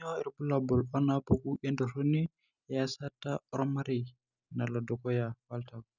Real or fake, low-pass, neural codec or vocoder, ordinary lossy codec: real; 7.2 kHz; none; none